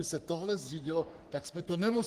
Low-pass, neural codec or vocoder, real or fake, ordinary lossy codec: 14.4 kHz; codec, 44.1 kHz, 3.4 kbps, Pupu-Codec; fake; Opus, 24 kbps